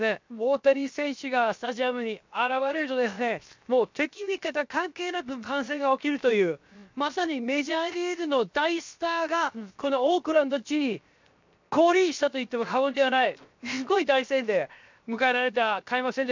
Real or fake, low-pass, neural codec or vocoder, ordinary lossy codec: fake; 7.2 kHz; codec, 16 kHz, 0.7 kbps, FocalCodec; MP3, 64 kbps